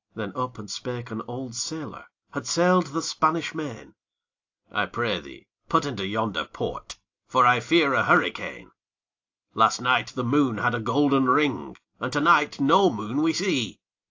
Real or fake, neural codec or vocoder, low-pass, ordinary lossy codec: real; none; 7.2 kHz; AAC, 48 kbps